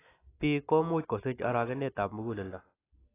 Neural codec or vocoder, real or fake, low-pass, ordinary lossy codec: none; real; 3.6 kHz; AAC, 16 kbps